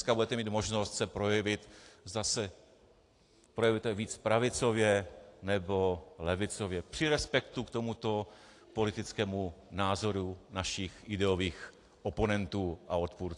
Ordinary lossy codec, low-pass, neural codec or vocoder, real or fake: AAC, 48 kbps; 10.8 kHz; none; real